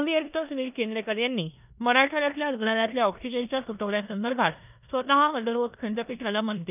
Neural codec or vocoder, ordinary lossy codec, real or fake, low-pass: codec, 16 kHz in and 24 kHz out, 0.9 kbps, LongCat-Audio-Codec, four codebook decoder; none; fake; 3.6 kHz